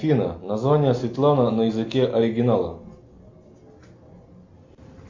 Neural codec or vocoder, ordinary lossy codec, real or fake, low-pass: none; MP3, 48 kbps; real; 7.2 kHz